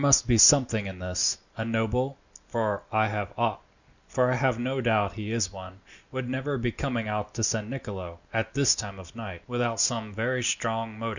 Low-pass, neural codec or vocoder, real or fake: 7.2 kHz; none; real